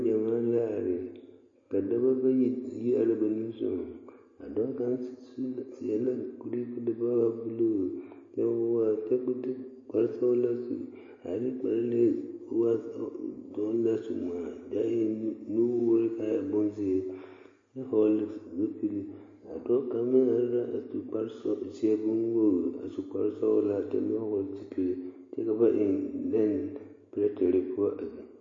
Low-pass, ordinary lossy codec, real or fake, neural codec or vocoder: 7.2 kHz; MP3, 32 kbps; real; none